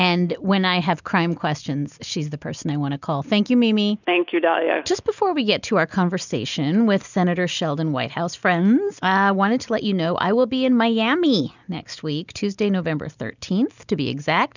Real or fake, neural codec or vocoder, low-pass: real; none; 7.2 kHz